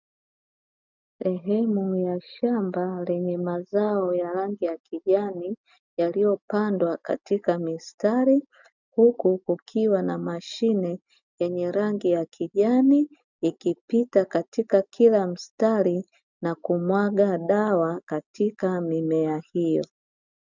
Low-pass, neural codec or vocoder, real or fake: 7.2 kHz; none; real